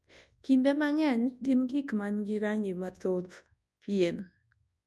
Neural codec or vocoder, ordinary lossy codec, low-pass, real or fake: codec, 24 kHz, 0.9 kbps, WavTokenizer, large speech release; none; none; fake